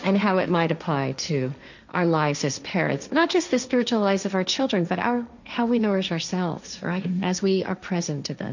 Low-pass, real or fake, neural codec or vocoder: 7.2 kHz; fake; codec, 16 kHz, 1.1 kbps, Voila-Tokenizer